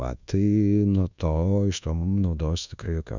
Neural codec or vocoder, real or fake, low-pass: codec, 24 kHz, 1.2 kbps, DualCodec; fake; 7.2 kHz